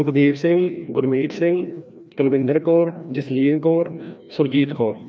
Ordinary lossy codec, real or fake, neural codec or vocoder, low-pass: none; fake; codec, 16 kHz, 1 kbps, FreqCodec, larger model; none